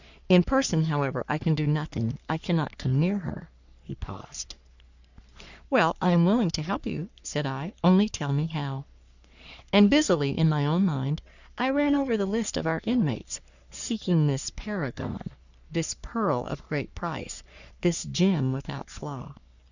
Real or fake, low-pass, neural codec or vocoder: fake; 7.2 kHz; codec, 44.1 kHz, 3.4 kbps, Pupu-Codec